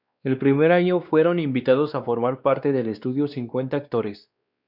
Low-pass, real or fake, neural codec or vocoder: 5.4 kHz; fake; codec, 16 kHz, 2 kbps, X-Codec, WavLM features, trained on Multilingual LibriSpeech